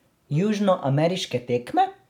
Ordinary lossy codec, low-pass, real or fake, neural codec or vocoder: none; 19.8 kHz; real; none